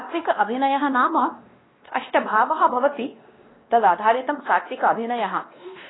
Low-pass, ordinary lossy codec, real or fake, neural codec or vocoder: 7.2 kHz; AAC, 16 kbps; fake; codec, 16 kHz, 1 kbps, X-Codec, WavLM features, trained on Multilingual LibriSpeech